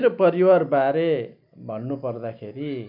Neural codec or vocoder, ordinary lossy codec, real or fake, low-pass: none; none; real; 5.4 kHz